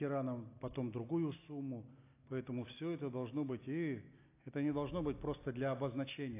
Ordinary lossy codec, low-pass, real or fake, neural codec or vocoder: AAC, 24 kbps; 3.6 kHz; real; none